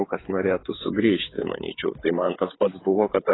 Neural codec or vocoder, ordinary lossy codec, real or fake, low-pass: codec, 16 kHz, 8 kbps, FreqCodec, smaller model; AAC, 16 kbps; fake; 7.2 kHz